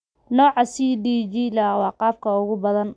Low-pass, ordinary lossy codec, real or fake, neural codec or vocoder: 9.9 kHz; none; real; none